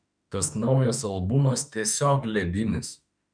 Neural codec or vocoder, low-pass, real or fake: autoencoder, 48 kHz, 32 numbers a frame, DAC-VAE, trained on Japanese speech; 9.9 kHz; fake